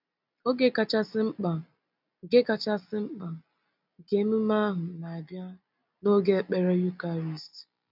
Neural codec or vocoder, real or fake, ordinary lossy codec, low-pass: none; real; none; 5.4 kHz